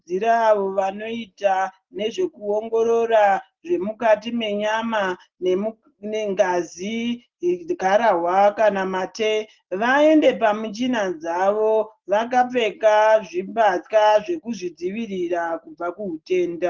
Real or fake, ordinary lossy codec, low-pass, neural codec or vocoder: real; Opus, 16 kbps; 7.2 kHz; none